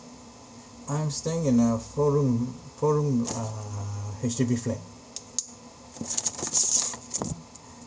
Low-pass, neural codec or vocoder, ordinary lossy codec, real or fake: none; none; none; real